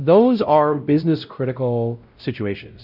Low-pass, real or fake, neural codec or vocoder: 5.4 kHz; fake; codec, 16 kHz, 0.5 kbps, X-Codec, WavLM features, trained on Multilingual LibriSpeech